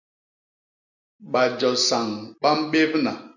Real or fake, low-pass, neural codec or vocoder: real; 7.2 kHz; none